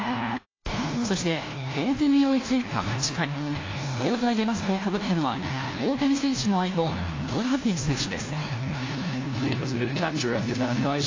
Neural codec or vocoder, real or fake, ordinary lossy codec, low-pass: codec, 16 kHz, 1 kbps, FunCodec, trained on LibriTTS, 50 frames a second; fake; AAC, 32 kbps; 7.2 kHz